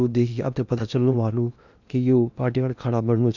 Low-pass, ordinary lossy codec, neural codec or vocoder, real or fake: 7.2 kHz; none; codec, 16 kHz in and 24 kHz out, 0.8 kbps, FocalCodec, streaming, 65536 codes; fake